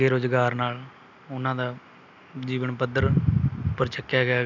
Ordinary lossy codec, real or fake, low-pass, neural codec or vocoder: none; real; 7.2 kHz; none